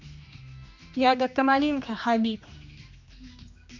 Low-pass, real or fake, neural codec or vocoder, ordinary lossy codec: 7.2 kHz; fake; codec, 16 kHz, 2 kbps, X-Codec, HuBERT features, trained on general audio; MP3, 64 kbps